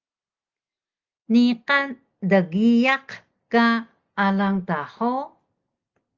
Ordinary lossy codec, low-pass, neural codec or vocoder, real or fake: Opus, 32 kbps; 7.2 kHz; none; real